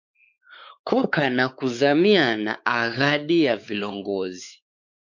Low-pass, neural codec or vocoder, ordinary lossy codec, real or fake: 7.2 kHz; codec, 16 kHz, 4 kbps, X-Codec, WavLM features, trained on Multilingual LibriSpeech; MP3, 64 kbps; fake